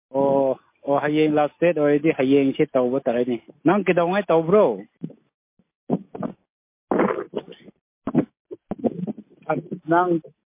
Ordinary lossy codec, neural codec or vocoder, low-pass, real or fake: MP3, 24 kbps; none; 3.6 kHz; real